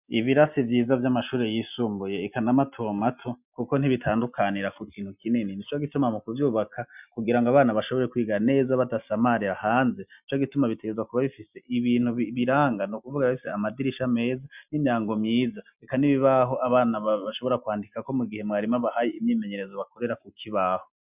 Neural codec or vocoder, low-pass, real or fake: none; 3.6 kHz; real